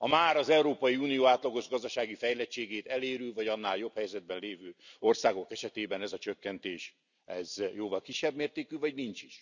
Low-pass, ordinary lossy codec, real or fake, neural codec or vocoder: 7.2 kHz; none; real; none